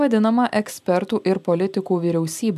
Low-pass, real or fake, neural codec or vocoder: 14.4 kHz; real; none